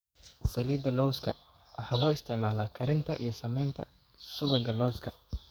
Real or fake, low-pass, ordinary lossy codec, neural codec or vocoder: fake; none; none; codec, 44.1 kHz, 2.6 kbps, SNAC